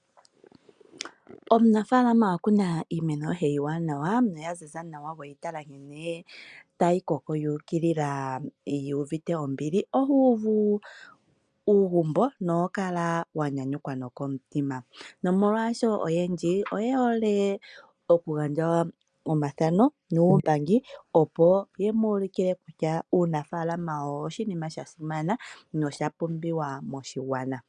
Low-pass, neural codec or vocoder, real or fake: 9.9 kHz; none; real